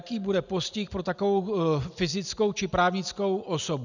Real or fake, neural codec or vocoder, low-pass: real; none; 7.2 kHz